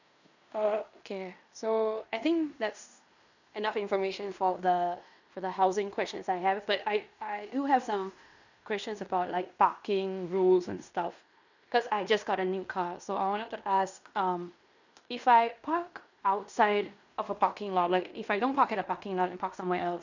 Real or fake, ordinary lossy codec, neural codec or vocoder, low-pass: fake; none; codec, 16 kHz in and 24 kHz out, 0.9 kbps, LongCat-Audio-Codec, fine tuned four codebook decoder; 7.2 kHz